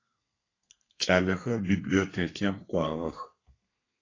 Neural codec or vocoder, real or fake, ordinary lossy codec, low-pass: codec, 32 kHz, 1.9 kbps, SNAC; fake; AAC, 32 kbps; 7.2 kHz